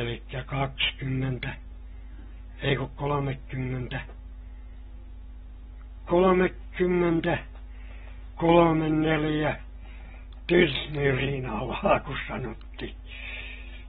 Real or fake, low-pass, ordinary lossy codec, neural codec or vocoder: real; 7.2 kHz; AAC, 16 kbps; none